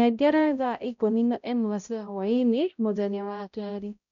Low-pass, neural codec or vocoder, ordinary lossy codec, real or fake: 7.2 kHz; codec, 16 kHz, 0.5 kbps, X-Codec, HuBERT features, trained on balanced general audio; none; fake